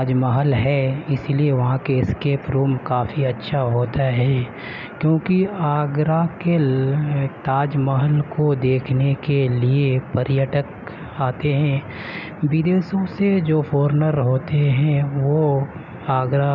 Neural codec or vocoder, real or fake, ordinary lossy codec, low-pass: none; real; none; 7.2 kHz